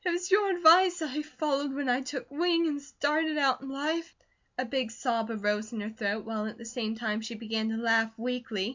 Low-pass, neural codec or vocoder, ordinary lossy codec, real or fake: 7.2 kHz; none; MP3, 64 kbps; real